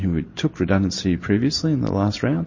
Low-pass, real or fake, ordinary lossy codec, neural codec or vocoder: 7.2 kHz; real; MP3, 32 kbps; none